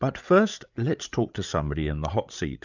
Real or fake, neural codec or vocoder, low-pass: fake; codec, 16 kHz, 8 kbps, FreqCodec, larger model; 7.2 kHz